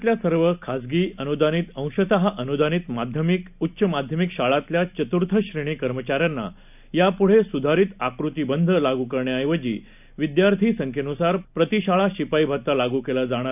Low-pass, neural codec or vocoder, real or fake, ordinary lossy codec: 3.6 kHz; none; real; none